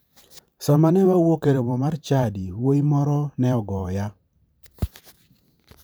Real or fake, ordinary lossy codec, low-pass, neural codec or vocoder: fake; none; none; vocoder, 44.1 kHz, 128 mel bands every 512 samples, BigVGAN v2